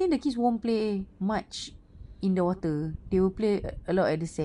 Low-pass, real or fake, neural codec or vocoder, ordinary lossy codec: 10.8 kHz; real; none; MP3, 64 kbps